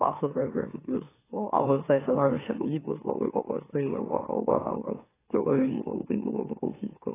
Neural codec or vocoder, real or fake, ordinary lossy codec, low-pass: autoencoder, 44.1 kHz, a latent of 192 numbers a frame, MeloTTS; fake; AAC, 16 kbps; 3.6 kHz